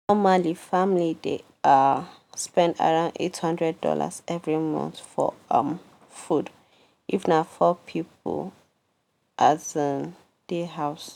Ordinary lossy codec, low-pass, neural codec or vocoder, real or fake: none; 19.8 kHz; none; real